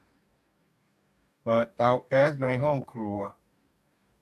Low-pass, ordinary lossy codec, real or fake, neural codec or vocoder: 14.4 kHz; none; fake; codec, 44.1 kHz, 2.6 kbps, DAC